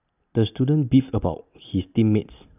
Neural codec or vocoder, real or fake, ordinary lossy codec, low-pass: none; real; none; 3.6 kHz